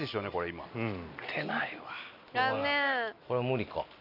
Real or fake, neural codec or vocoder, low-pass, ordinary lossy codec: real; none; 5.4 kHz; none